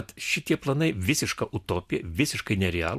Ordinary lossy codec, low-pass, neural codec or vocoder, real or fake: MP3, 96 kbps; 14.4 kHz; none; real